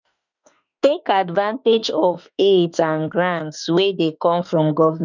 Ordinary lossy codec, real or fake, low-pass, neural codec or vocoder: none; fake; 7.2 kHz; autoencoder, 48 kHz, 32 numbers a frame, DAC-VAE, trained on Japanese speech